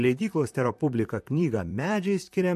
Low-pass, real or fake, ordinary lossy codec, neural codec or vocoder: 14.4 kHz; fake; MP3, 64 kbps; vocoder, 44.1 kHz, 128 mel bands, Pupu-Vocoder